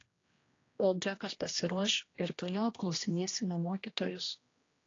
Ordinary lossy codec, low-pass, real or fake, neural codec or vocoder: AAC, 32 kbps; 7.2 kHz; fake; codec, 16 kHz, 1 kbps, X-Codec, HuBERT features, trained on general audio